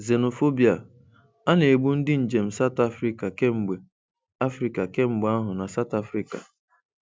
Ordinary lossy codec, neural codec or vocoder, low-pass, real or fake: none; none; none; real